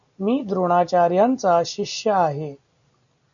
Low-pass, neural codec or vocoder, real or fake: 7.2 kHz; none; real